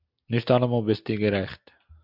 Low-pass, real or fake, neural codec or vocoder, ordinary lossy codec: 5.4 kHz; real; none; MP3, 48 kbps